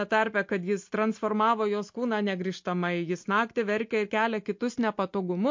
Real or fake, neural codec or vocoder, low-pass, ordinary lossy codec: real; none; 7.2 kHz; MP3, 48 kbps